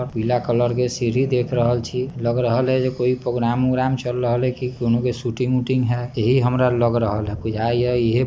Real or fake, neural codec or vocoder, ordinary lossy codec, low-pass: real; none; none; none